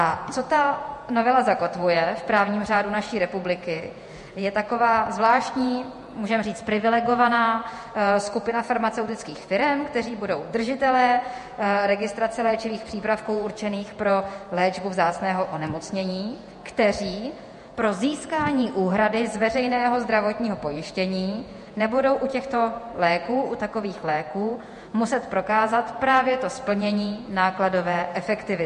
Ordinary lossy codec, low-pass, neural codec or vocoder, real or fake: MP3, 48 kbps; 14.4 kHz; vocoder, 48 kHz, 128 mel bands, Vocos; fake